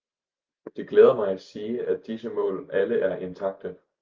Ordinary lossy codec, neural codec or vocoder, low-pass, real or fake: Opus, 24 kbps; none; 7.2 kHz; real